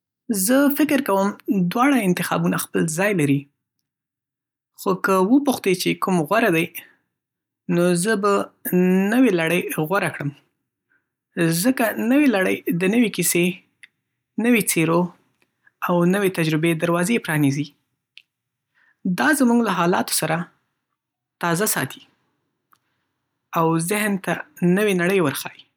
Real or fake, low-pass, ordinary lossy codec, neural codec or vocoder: real; 19.8 kHz; none; none